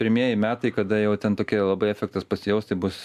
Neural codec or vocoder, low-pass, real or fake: none; 14.4 kHz; real